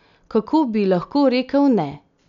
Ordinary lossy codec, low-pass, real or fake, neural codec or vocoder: none; 7.2 kHz; real; none